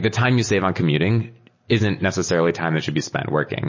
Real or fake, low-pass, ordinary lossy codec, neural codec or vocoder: real; 7.2 kHz; MP3, 32 kbps; none